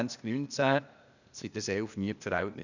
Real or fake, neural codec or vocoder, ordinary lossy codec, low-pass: fake; codec, 16 kHz, 0.8 kbps, ZipCodec; none; 7.2 kHz